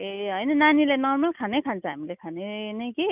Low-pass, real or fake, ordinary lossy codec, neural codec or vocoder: 3.6 kHz; real; none; none